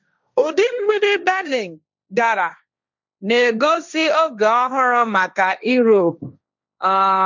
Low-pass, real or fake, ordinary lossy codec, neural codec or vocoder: 7.2 kHz; fake; none; codec, 16 kHz, 1.1 kbps, Voila-Tokenizer